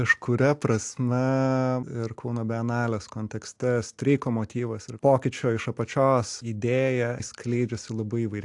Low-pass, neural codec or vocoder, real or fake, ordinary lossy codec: 10.8 kHz; none; real; AAC, 64 kbps